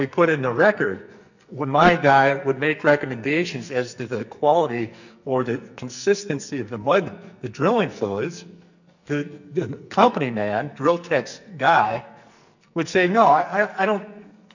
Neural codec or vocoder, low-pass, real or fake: codec, 44.1 kHz, 2.6 kbps, SNAC; 7.2 kHz; fake